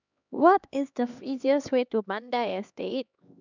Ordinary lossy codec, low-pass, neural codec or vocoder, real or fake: none; 7.2 kHz; codec, 16 kHz, 2 kbps, X-Codec, HuBERT features, trained on LibriSpeech; fake